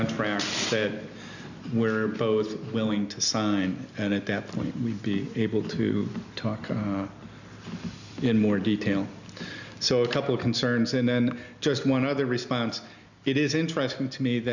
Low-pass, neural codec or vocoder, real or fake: 7.2 kHz; none; real